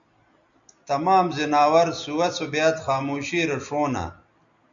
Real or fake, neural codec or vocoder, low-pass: real; none; 7.2 kHz